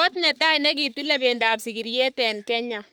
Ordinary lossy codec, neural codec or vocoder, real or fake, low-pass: none; codec, 44.1 kHz, 3.4 kbps, Pupu-Codec; fake; none